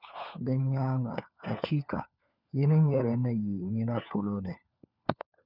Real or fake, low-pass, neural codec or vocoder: fake; 5.4 kHz; codec, 16 kHz, 4 kbps, FunCodec, trained on LibriTTS, 50 frames a second